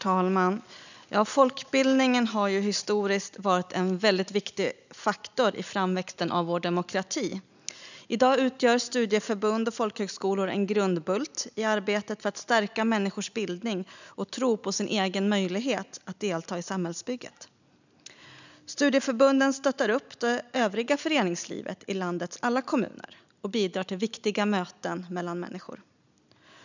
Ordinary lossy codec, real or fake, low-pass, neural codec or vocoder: none; real; 7.2 kHz; none